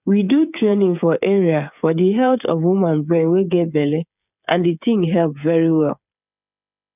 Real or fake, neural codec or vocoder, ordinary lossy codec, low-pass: fake; codec, 16 kHz, 8 kbps, FreqCodec, smaller model; none; 3.6 kHz